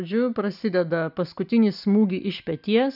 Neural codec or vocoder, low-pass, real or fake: none; 5.4 kHz; real